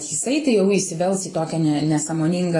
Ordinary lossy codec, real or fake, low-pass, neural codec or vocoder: AAC, 32 kbps; fake; 10.8 kHz; vocoder, 24 kHz, 100 mel bands, Vocos